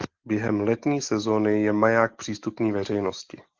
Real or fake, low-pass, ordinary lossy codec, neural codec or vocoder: real; 7.2 kHz; Opus, 16 kbps; none